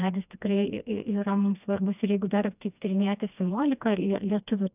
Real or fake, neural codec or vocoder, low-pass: fake; codec, 16 kHz, 2 kbps, FreqCodec, smaller model; 3.6 kHz